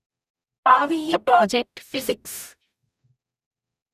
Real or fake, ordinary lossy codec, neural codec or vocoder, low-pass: fake; none; codec, 44.1 kHz, 0.9 kbps, DAC; 14.4 kHz